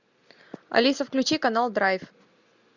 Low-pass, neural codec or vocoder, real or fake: 7.2 kHz; none; real